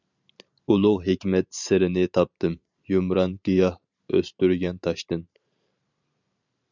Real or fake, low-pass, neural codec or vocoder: fake; 7.2 kHz; vocoder, 44.1 kHz, 128 mel bands every 512 samples, BigVGAN v2